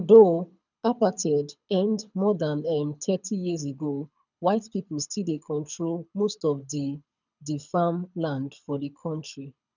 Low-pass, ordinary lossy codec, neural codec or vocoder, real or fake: 7.2 kHz; none; codec, 24 kHz, 6 kbps, HILCodec; fake